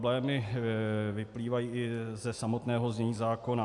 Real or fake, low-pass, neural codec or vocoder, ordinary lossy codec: real; 10.8 kHz; none; AAC, 64 kbps